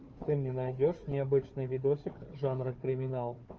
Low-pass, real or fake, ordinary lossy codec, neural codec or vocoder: 7.2 kHz; fake; Opus, 24 kbps; codec, 16 kHz, 4 kbps, FunCodec, trained on Chinese and English, 50 frames a second